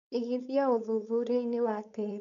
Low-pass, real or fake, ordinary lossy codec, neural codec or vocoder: 7.2 kHz; fake; none; codec, 16 kHz, 4.8 kbps, FACodec